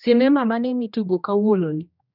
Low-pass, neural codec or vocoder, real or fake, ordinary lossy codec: 5.4 kHz; codec, 16 kHz, 1 kbps, X-Codec, HuBERT features, trained on general audio; fake; none